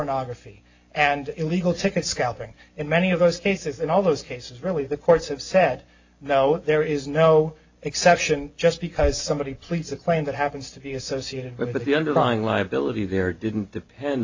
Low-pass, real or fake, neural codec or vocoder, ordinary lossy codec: 7.2 kHz; real; none; AAC, 48 kbps